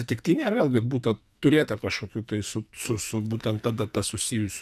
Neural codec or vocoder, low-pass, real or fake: codec, 44.1 kHz, 2.6 kbps, SNAC; 14.4 kHz; fake